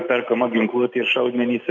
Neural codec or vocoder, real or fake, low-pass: none; real; 7.2 kHz